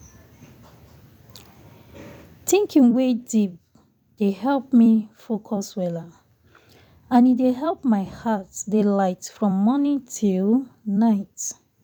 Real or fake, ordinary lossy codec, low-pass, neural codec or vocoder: fake; none; 19.8 kHz; vocoder, 44.1 kHz, 128 mel bands every 256 samples, BigVGAN v2